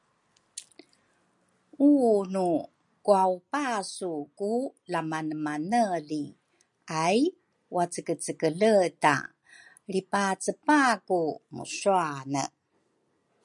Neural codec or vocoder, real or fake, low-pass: none; real; 10.8 kHz